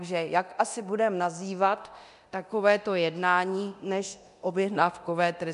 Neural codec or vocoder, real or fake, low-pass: codec, 24 kHz, 0.9 kbps, DualCodec; fake; 10.8 kHz